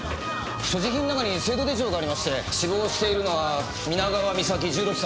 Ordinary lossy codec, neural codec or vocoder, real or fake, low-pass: none; none; real; none